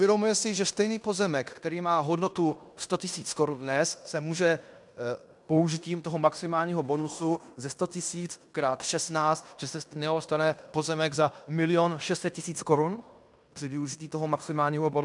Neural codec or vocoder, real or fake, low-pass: codec, 16 kHz in and 24 kHz out, 0.9 kbps, LongCat-Audio-Codec, fine tuned four codebook decoder; fake; 10.8 kHz